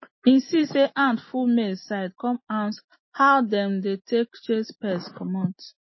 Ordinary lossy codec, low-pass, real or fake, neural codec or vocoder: MP3, 24 kbps; 7.2 kHz; real; none